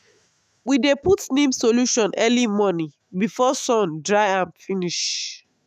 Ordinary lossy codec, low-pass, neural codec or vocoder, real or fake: none; 14.4 kHz; autoencoder, 48 kHz, 128 numbers a frame, DAC-VAE, trained on Japanese speech; fake